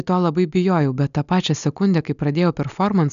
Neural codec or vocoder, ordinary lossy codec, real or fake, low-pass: none; MP3, 96 kbps; real; 7.2 kHz